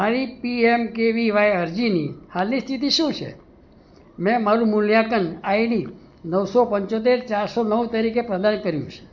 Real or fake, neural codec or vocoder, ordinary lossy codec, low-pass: real; none; none; 7.2 kHz